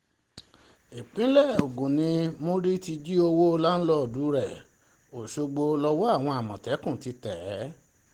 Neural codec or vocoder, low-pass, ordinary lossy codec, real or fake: none; 19.8 kHz; Opus, 16 kbps; real